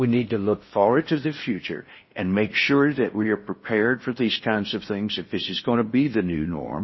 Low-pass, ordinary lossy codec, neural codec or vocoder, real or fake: 7.2 kHz; MP3, 24 kbps; codec, 16 kHz in and 24 kHz out, 0.6 kbps, FocalCodec, streaming, 4096 codes; fake